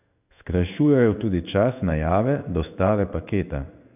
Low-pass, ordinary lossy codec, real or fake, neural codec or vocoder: 3.6 kHz; none; fake; codec, 16 kHz in and 24 kHz out, 1 kbps, XY-Tokenizer